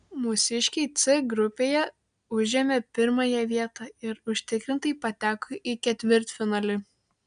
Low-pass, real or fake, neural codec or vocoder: 9.9 kHz; real; none